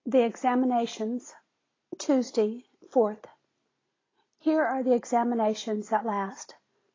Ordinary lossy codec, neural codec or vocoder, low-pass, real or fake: AAC, 32 kbps; none; 7.2 kHz; real